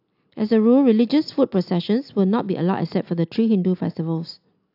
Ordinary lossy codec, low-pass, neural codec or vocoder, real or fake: AAC, 48 kbps; 5.4 kHz; none; real